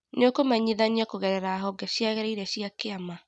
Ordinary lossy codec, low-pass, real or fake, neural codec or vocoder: none; none; real; none